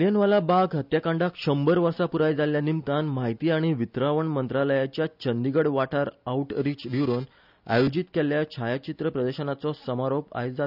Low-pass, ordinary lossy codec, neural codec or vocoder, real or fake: 5.4 kHz; none; none; real